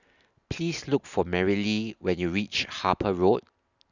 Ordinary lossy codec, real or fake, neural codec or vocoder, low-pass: none; real; none; 7.2 kHz